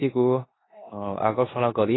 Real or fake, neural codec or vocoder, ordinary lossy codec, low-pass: fake; codec, 16 kHz in and 24 kHz out, 0.9 kbps, LongCat-Audio-Codec, four codebook decoder; AAC, 16 kbps; 7.2 kHz